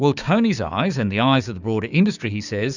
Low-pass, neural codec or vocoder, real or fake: 7.2 kHz; codec, 16 kHz, 6 kbps, DAC; fake